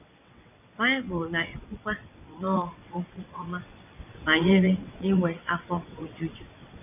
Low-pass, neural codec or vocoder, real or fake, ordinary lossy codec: 3.6 kHz; vocoder, 22.05 kHz, 80 mel bands, WaveNeXt; fake; none